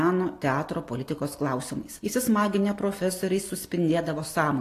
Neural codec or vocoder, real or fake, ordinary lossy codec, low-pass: none; real; AAC, 48 kbps; 14.4 kHz